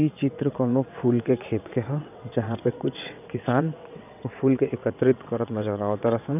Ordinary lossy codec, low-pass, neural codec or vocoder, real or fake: none; 3.6 kHz; vocoder, 22.05 kHz, 80 mel bands, WaveNeXt; fake